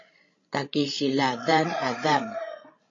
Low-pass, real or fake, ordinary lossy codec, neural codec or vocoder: 7.2 kHz; fake; AAC, 32 kbps; codec, 16 kHz, 16 kbps, FreqCodec, larger model